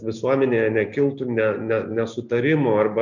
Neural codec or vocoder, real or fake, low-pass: none; real; 7.2 kHz